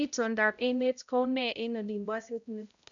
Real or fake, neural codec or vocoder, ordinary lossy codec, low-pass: fake; codec, 16 kHz, 0.5 kbps, X-Codec, HuBERT features, trained on balanced general audio; none; 7.2 kHz